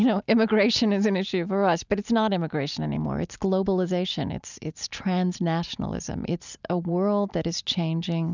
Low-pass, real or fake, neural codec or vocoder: 7.2 kHz; real; none